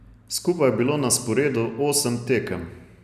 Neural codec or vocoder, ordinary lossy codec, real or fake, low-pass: none; none; real; 14.4 kHz